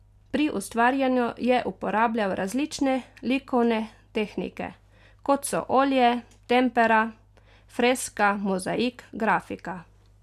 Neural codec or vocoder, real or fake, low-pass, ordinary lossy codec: none; real; 14.4 kHz; none